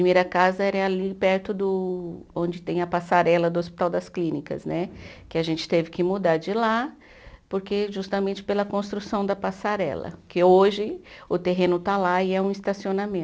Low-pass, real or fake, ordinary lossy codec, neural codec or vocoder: none; real; none; none